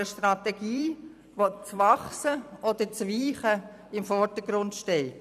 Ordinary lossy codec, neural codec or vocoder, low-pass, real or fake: none; vocoder, 44.1 kHz, 128 mel bands every 256 samples, BigVGAN v2; 14.4 kHz; fake